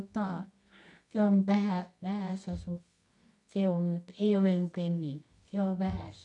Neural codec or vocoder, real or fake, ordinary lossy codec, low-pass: codec, 24 kHz, 0.9 kbps, WavTokenizer, medium music audio release; fake; none; 10.8 kHz